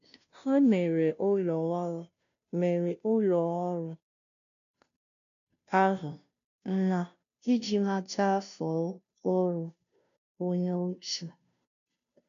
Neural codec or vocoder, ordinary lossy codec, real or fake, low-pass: codec, 16 kHz, 0.5 kbps, FunCodec, trained on Chinese and English, 25 frames a second; none; fake; 7.2 kHz